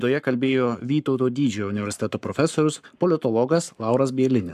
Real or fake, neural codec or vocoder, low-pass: fake; codec, 44.1 kHz, 7.8 kbps, Pupu-Codec; 14.4 kHz